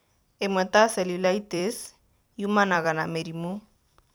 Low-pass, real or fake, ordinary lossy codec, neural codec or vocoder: none; fake; none; vocoder, 44.1 kHz, 128 mel bands every 256 samples, BigVGAN v2